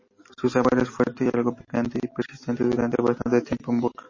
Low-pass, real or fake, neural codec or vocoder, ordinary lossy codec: 7.2 kHz; real; none; MP3, 32 kbps